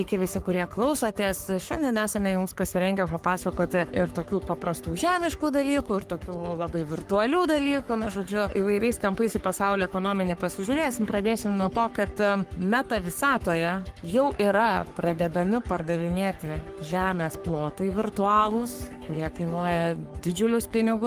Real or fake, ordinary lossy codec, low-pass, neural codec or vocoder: fake; Opus, 32 kbps; 14.4 kHz; codec, 44.1 kHz, 3.4 kbps, Pupu-Codec